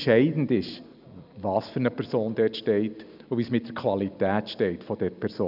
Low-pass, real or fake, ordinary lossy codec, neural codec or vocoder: 5.4 kHz; real; none; none